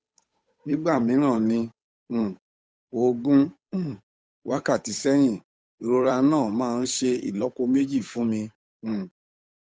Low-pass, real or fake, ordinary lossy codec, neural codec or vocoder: none; fake; none; codec, 16 kHz, 8 kbps, FunCodec, trained on Chinese and English, 25 frames a second